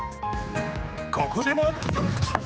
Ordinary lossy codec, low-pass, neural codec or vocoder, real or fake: none; none; codec, 16 kHz, 4 kbps, X-Codec, HuBERT features, trained on general audio; fake